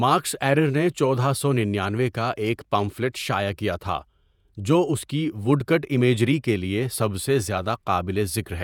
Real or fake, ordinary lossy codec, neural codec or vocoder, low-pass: fake; none; vocoder, 48 kHz, 128 mel bands, Vocos; 19.8 kHz